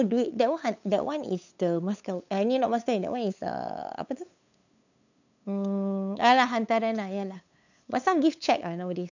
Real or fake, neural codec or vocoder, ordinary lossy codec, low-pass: fake; codec, 16 kHz, 8 kbps, FunCodec, trained on LibriTTS, 25 frames a second; none; 7.2 kHz